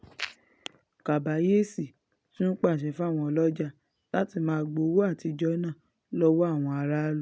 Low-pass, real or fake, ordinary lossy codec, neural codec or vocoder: none; real; none; none